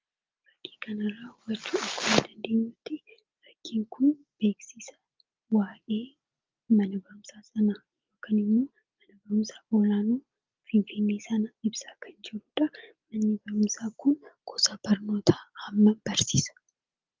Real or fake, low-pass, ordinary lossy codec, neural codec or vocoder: real; 7.2 kHz; Opus, 24 kbps; none